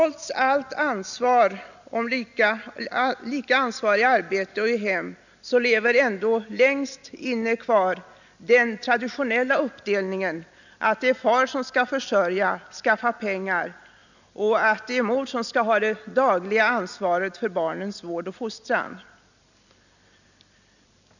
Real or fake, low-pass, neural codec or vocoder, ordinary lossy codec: real; 7.2 kHz; none; none